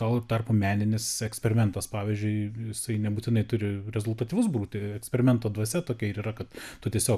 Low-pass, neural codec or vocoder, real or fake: 14.4 kHz; none; real